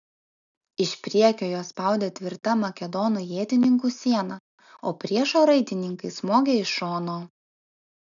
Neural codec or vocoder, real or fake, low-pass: none; real; 7.2 kHz